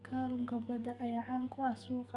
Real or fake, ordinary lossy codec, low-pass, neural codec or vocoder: fake; none; 10.8 kHz; codec, 44.1 kHz, 2.6 kbps, SNAC